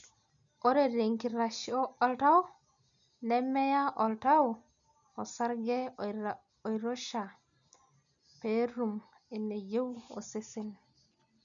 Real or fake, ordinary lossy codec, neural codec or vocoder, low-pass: real; none; none; 7.2 kHz